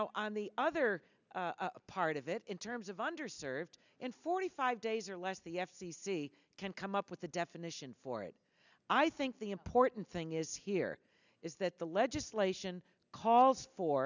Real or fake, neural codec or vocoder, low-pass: real; none; 7.2 kHz